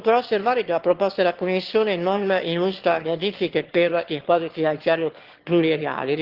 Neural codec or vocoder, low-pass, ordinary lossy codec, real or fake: autoencoder, 22.05 kHz, a latent of 192 numbers a frame, VITS, trained on one speaker; 5.4 kHz; Opus, 16 kbps; fake